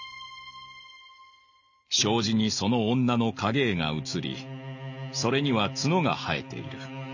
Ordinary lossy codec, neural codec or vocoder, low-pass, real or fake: none; none; 7.2 kHz; real